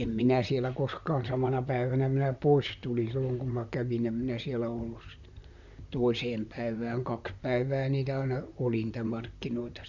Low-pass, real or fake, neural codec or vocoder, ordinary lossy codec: 7.2 kHz; fake; vocoder, 44.1 kHz, 128 mel bands, Pupu-Vocoder; none